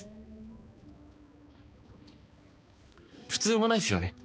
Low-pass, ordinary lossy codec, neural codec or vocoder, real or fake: none; none; codec, 16 kHz, 2 kbps, X-Codec, HuBERT features, trained on balanced general audio; fake